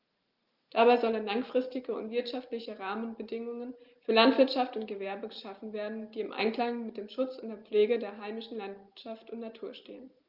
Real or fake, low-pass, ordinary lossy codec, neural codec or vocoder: real; 5.4 kHz; Opus, 32 kbps; none